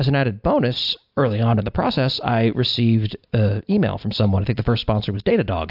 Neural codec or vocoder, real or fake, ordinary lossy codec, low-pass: none; real; AAC, 48 kbps; 5.4 kHz